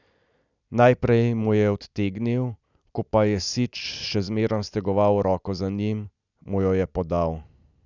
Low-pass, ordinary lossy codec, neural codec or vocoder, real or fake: 7.2 kHz; none; none; real